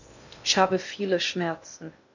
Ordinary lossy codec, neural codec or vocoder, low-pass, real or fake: none; codec, 16 kHz in and 24 kHz out, 0.6 kbps, FocalCodec, streaming, 2048 codes; 7.2 kHz; fake